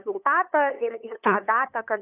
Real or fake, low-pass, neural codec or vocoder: fake; 3.6 kHz; codec, 16 kHz, 8 kbps, FunCodec, trained on LibriTTS, 25 frames a second